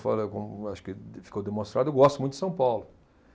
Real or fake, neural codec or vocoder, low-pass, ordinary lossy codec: real; none; none; none